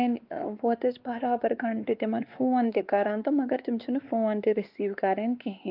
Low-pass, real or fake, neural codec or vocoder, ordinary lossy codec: 5.4 kHz; fake; codec, 16 kHz, 2 kbps, X-Codec, WavLM features, trained on Multilingual LibriSpeech; Opus, 24 kbps